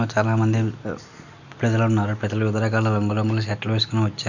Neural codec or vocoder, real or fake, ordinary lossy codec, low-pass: none; real; none; 7.2 kHz